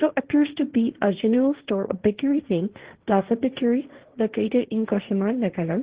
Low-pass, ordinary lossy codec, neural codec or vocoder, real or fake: 3.6 kHz; Opus, 16 kbps; codec, 16 kHz, 1.1 kbps, Voila-Tokenizer; fake